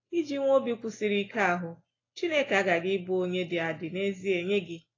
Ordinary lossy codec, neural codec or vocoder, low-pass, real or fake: AAC, 32 kbps; none; 7.2 kHz; real